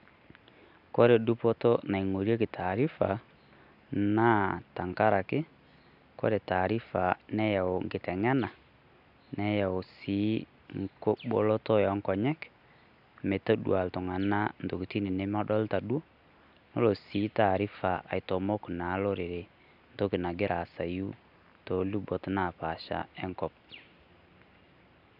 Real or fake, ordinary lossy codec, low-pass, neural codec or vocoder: real; none; 5.4 kHz; none